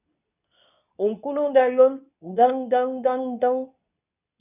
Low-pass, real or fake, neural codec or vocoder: 3.6 kHz; fake; codec, 24 kHz, 0.9 kbps, WavTokenizer, medium speech release version 2